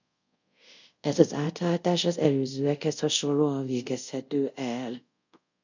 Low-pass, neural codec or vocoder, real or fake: 7.2 kHz; codec, 24 kHz, 0.5 kbps, DualCodec; fake